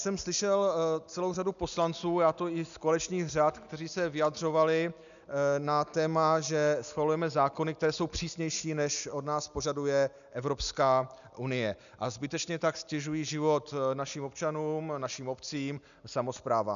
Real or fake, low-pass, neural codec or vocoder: real; 7.2 kHz; none